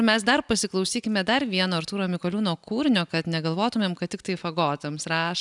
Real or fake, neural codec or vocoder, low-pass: real; none; 10.8 kHz